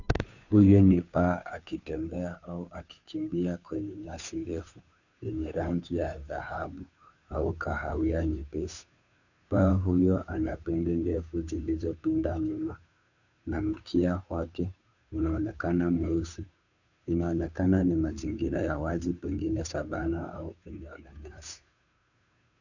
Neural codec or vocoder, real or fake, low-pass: codec, 16 kHz, 2 kbps, FunCodec, trained on Chinese and English, 25 frames a second; fake; 7.2 kHz